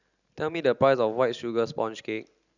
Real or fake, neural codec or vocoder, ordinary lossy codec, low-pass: real; none; none; 7.2 kHz